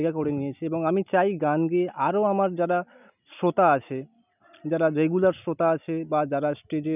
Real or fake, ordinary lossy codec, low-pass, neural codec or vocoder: real; none; 3.6 kHz; none